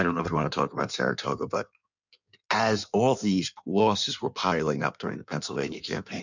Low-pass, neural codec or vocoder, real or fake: 7.2 kHz; codec, 16 kHz in and 24 kHz out, 1.1 kbps, FireRedTTS-2 codec; fake